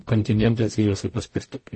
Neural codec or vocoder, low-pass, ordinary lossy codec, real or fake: codec, 44.1 kHz, 0.9 kbps, DAC; 9.9 kHz; MP3, 32 kbps; fake